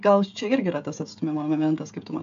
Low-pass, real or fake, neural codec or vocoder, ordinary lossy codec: 7.2 kHz; fake; codec, 16 kHz, 16 kbps, FreqCodec, smaller model; AAC, 64 kbps